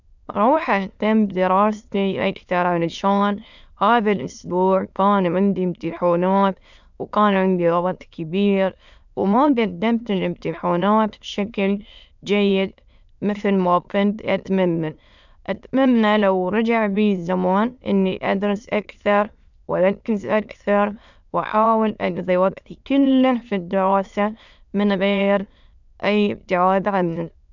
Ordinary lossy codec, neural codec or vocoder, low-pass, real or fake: none; autoencoder, 22.05 kHz, a latent of 192 numbers a frame, VITS, trained on many speakers; 7.2 kHz; fake